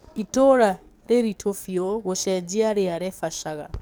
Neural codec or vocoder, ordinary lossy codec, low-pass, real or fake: codec, 44.1 kHz, 7.8 kbps, DAC; none; none; fake